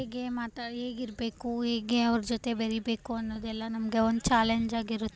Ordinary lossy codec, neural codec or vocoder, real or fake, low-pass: none; none; real; none